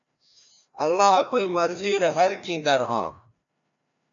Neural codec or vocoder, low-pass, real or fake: codec, 16 kHz, 1 kbps, FreqCodec, larger model; 7.2 kHz; fake